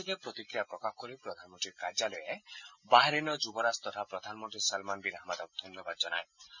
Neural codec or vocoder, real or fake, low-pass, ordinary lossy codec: none; real; 7.2 kHz; none